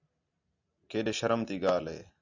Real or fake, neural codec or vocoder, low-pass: real; none; 7.2 kHz